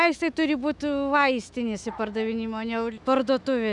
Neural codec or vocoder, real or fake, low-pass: autoencoder, 48 kHz, 128 numbers a frame, DAC-VAE, trained on Japanese speech; fake; 10.8 kHz